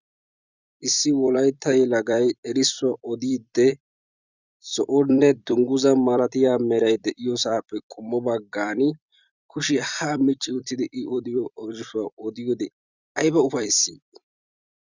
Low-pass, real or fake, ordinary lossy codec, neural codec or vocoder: 7.2 kHz; fake; Opus, 64 kbps; vocoder, 44.1 kHz, 128 mel bands every 256 samples, BigVGAN v2